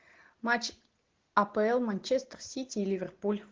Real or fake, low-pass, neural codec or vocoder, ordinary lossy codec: real; 7.2 kHz; none; Opus, 16 kbps